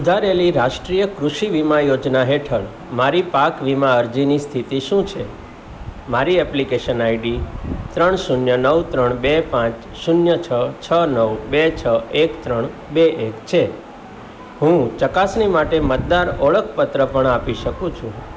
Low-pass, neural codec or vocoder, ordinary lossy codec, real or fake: none; none; none; real